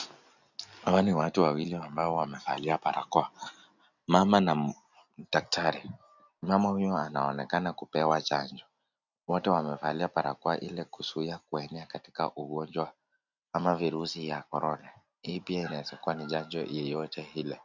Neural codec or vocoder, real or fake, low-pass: none; real; 7.2 kHz